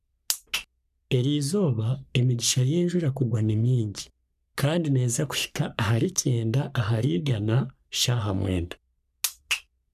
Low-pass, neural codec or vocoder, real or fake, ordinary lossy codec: 14.4 kHz; codec, 44.1 kHz, 3.4 kbps, Pupu-Codec; fake; none